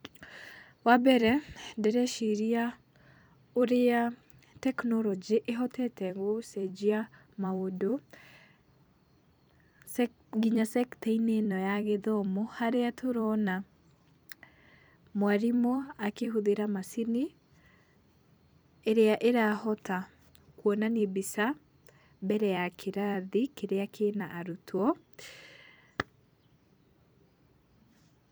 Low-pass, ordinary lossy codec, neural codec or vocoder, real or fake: none; none; vocoder, 44.1 kHz, 128 mel bands every 256 samples, BigVGAN v2; fake